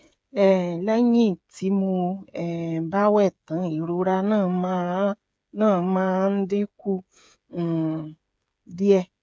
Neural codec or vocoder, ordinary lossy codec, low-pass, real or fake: codec, 16 kHz, 8 kbps, FreqCodec, smaller model; none; none; fake